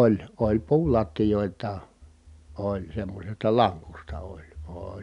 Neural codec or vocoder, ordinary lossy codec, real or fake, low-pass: none; none; real; 10.8 kHz